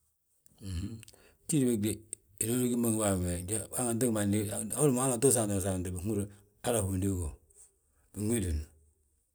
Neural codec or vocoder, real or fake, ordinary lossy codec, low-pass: none; real; none; none